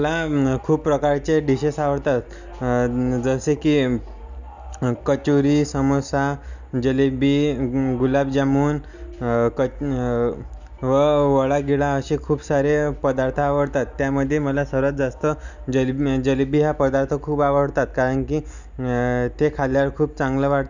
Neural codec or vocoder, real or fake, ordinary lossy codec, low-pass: none; real; none; 7.2 kHz